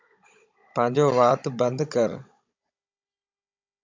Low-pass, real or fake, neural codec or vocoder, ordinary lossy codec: 7.2 kHz; fake; codec, 16 kHz, 16 kbps, FunCodec, trained on Chinese and English, 50 frames a second; MP3, 64 kbps